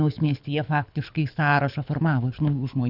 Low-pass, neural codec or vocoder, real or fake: 5.4 kHz; codec, 24 kHz, 6 kbps, HILCodec; fake